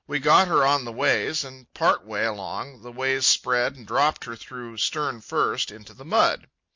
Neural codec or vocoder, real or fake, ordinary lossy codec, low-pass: none; real; MP3, 48 kbps; 7.2 kHz